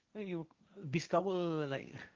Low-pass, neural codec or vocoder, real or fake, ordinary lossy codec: 7.2 kHz; codec, 16 kHz, 1.1 kbps, Voila-Tokenizer; fake; Opus, 32 kbps